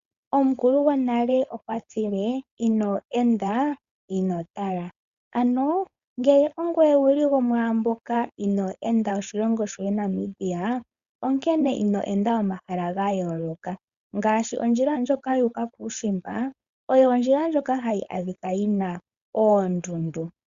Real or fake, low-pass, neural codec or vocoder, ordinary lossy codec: fake; 7.2 kHz; codec, 16 kHz, 4.8 kbps, FACodec; Opus, 64 kbps